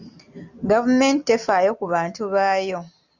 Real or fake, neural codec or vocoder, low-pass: real; none; 7.2 kHz